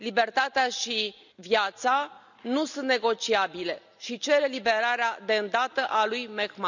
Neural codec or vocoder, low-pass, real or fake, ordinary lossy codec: none; 7.2 kHz; real; none